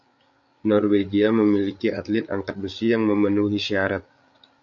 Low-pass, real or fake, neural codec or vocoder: 7.2 kHz; fake; codec, 16 kHz, 8 kbps, FreqCodec, larger model